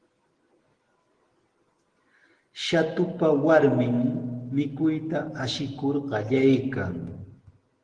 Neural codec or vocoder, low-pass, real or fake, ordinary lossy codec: none; 9.9 kHz; real; Opus, 16 kbps